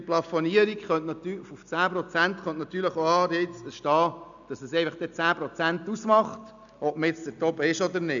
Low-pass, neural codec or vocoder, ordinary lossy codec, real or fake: 7.2 kHz; none; none; real